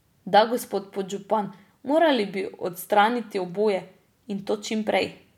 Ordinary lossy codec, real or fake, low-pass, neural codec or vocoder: none; real; 19.8 kHz; none